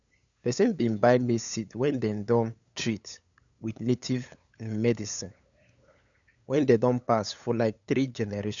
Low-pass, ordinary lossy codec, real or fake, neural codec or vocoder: 7.2 kHz; none; fake; codec, 16 kHz, 8 kbps, FunCodec, trained on LibriTTS, 25 frames a second